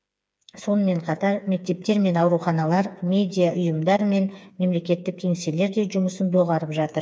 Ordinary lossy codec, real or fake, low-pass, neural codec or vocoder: none; fake; none; codec, 16 kHz, 4 kbps, FreqCodec, smaller model